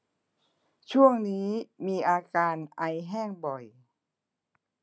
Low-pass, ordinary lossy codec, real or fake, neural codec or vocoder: none; none; real; none